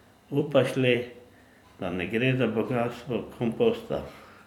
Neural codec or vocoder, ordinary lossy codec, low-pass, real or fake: vocoder, 44.1 kHz, 128 mel bands every 256 samples, BigVGAN v2; none; 19.8 kHz; fake